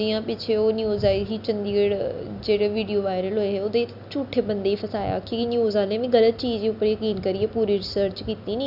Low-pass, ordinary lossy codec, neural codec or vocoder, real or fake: 5.4 kHz; none; none; real